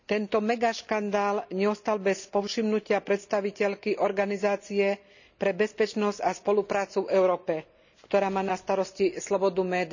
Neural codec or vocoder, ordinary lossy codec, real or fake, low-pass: none; none; real; 7.2 kHz